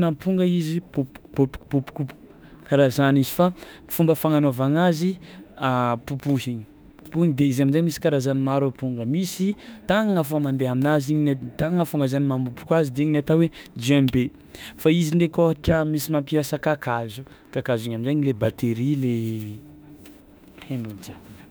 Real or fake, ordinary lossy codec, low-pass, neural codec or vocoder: fake; none; none; autoencoder, 48 kHz, 32 numbers a frame, DAC-VAE, trained on Japanese speech